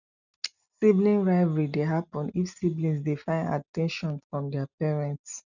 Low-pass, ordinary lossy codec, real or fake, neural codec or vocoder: 7.2 kHz; none; real; none